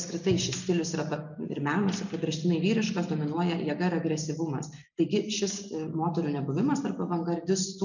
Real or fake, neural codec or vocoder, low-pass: real; none; 7.2 kHz